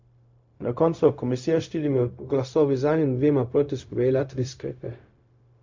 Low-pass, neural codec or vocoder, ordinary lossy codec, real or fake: 7.2 kHz; codec, 16 kHz, 0.4 kbps, LongCat-Audio-Codec; MP3, 48 kbps; fake